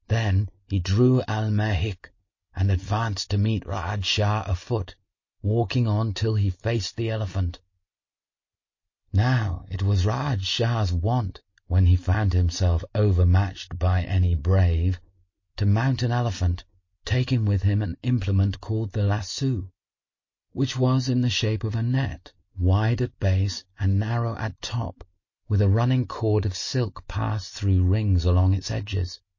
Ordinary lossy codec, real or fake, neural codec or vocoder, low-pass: MP3, 32 kbps; real; none; 7.2 kHz